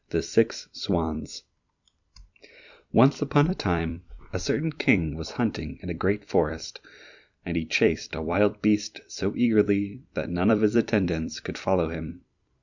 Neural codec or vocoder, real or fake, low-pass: vocoder, 44.1 kHz, 128 mel bands every 256 samples, BigVGAN v2; fake; 7.2 kHz